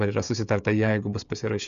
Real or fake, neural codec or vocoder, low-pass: fake; codec, 16 kHz, 16 kbps, FreqCodec, smaller model; 7.2 kHz